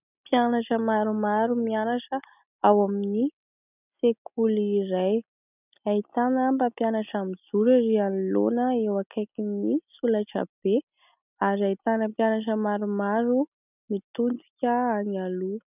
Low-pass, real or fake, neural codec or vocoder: 3.6 kHz; real; none